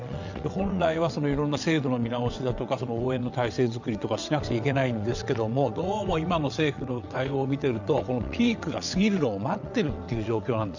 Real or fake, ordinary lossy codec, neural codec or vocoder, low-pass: fake; none; vocoder, 22.05 kHz, 80 mel bands, WaveNeXt; 7.2 kHz